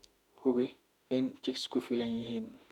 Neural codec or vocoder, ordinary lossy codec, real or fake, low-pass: autoencoder, 48 kHz, 32 numbers a frame, DAC-VAE, trained on Japanese speech; none; fake; 19.8 kHz